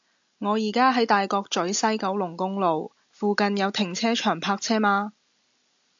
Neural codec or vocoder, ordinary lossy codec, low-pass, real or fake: none; AAC, 64 kbps; 7.2 kHz; real